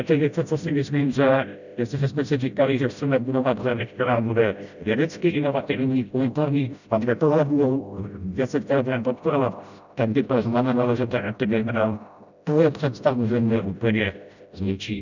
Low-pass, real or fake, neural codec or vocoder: 7.2 kHz; fake; codec, 16 kHz, 0.5 kbps, FreqCodec, smaller model